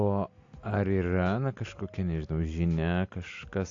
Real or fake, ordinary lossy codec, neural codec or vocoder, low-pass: real; AAC, 48 kbps; none; 7.2 kHz